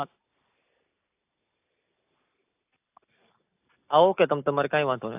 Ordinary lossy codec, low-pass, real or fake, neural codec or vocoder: AAC, 32 kbps; 3.6 kHz; real; none